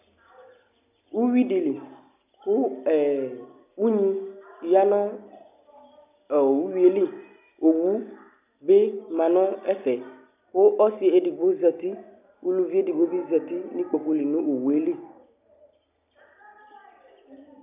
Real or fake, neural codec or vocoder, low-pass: real; none; 3.6 kHz